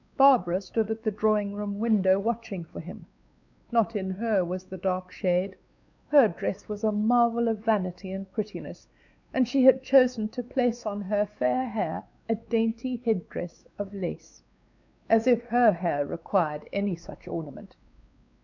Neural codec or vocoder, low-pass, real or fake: codec, 16 kHz, 4 kbps, X-Codec, WavLM features, trained on Multilingual LibriSpeech; 7.2 kHz; fake